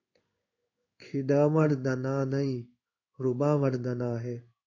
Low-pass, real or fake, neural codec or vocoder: 7.2 kHz; fake; codec, 16 kHz in and 24 kHz out, 1 kbps, XY-Tokenizer